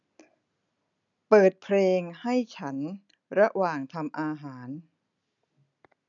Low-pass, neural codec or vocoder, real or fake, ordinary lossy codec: 7.2 kHz; none; real; none